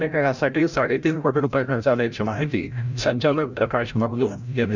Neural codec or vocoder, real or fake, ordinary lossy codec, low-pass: codec, 16 kHz, 0.5 kbps, FreqCodec, larger model; fake; none; 7.2 kHz